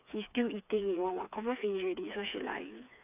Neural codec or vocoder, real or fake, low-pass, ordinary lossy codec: codec, 16 kHz, 4 kbps, FreqCodec, smaller model; fake; 3.6 kHz; none